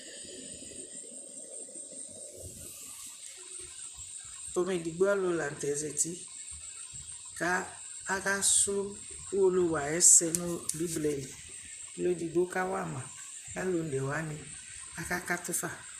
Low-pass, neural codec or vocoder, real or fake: 14.4 kHz; vocoder, 44.1 kHz, 128 mel bands, Pupu-Vocoder; fake